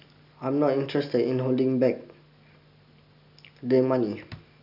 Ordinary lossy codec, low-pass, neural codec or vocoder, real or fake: none; 5.4 kHz; none; real